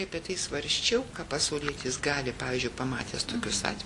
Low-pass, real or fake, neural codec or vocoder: 10.8 kHz; real; none